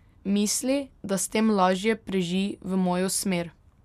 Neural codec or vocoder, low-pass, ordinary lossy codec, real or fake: none; 14.4 kHz; none; real